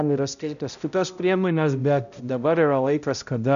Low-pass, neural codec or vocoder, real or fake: 7.2 kHz; codec, 16 kHz, 0.5 kbps, X-Codec, HuBERT features, trained on balanced general audio; fake